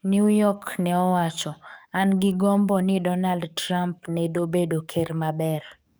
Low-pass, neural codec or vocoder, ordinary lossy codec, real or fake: none; codec, 44.1 kHz, 7.8 kbps, DAC; none; fake